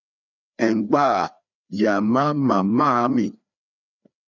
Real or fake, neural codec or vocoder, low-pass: fake; codec, 16 kHz, 2 kbps, FreqCodec, larger model; 7.2 kHz